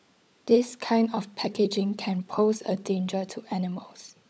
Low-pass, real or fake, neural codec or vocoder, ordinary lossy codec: none; fake; codec, 16 kHz, 16 kbps, FunCodec, trained on LibriTTS, 50 frames a second; none